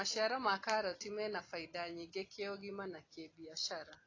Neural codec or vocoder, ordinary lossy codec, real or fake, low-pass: none; AAC, 32 kbps; real; 7.2 kHz